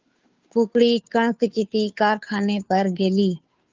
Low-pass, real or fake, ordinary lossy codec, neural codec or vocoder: 7.2 kHz; fake; Opus, 24 kbps; codec, 16 kHz, 2 kbps, FunCodec, trained on Chinese and English, 25 frames a second